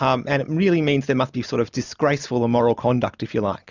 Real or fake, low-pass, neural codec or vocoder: real; 7.2 kHz; none